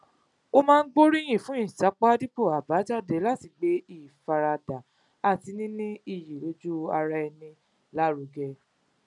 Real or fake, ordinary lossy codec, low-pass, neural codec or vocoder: real; none; 10.8 kHz; none